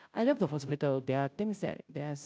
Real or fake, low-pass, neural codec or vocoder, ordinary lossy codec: fake; none; codec, 16 kHz, 0.5 kbps, FunCodec, trained on Chinese and English, 25 frames a second; none